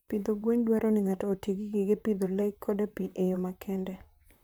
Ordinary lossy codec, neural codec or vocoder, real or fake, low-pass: none; vocoder, 44.1 kHz, 128 mel bands, Pupu-Vocoder; fake; none